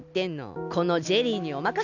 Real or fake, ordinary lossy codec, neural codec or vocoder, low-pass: real; none; none; 7.2 kHz